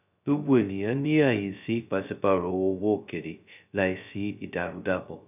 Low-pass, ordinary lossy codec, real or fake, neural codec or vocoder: 3.6 kHz; none; fake; codec, 16 kHz, 0.2 kbps, FocalCodec